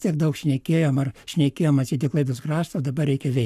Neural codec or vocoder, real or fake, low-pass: codec, 44.1 kHz, 7.8 kbps, Pupu-Codec; fake; 14.4 kHz